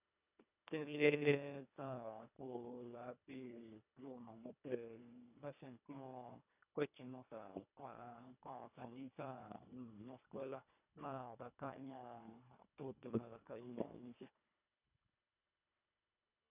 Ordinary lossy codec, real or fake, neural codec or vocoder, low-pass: none; fake; codec, 24 kHz, 1.5 kbps, HILCodec; 3.6 kHz